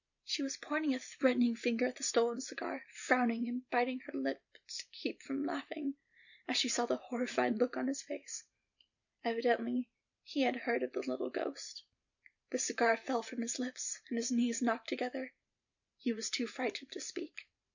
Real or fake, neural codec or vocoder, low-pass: fake; vocoder, 44.1 kHz, 128 mel bands every 512 samples, BigVGAN v2; 7.2 kHz